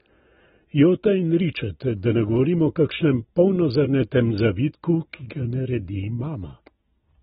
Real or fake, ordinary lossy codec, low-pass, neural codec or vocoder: real; AAC, 16 kbps; 7.2 kHz; none